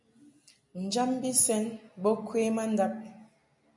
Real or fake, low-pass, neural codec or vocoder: real; 10.8 kHz; none